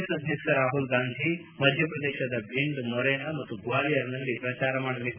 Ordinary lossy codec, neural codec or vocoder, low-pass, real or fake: none; none; 3.6 kHz; real